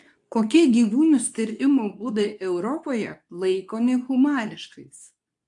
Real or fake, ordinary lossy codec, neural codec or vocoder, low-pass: fake; AAC, 64 kbps; codec, 24 kHz, 0.9 kbps, WavTokenizer, medium speech release version 2; 10.8 kHz